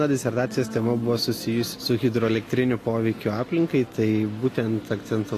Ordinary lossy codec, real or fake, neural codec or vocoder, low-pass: AAC, 48 kbps; real; none; 14.4 kHz